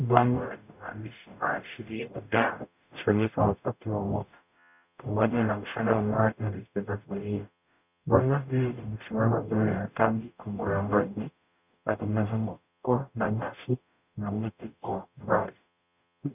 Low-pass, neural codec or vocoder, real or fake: 3.6 kHz; codec, 44.1 kHz, 0.9 kbps, DAC; fake